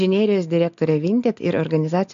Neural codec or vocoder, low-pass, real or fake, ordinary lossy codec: none; 7.2 kHz; real; AAC, 64 kbps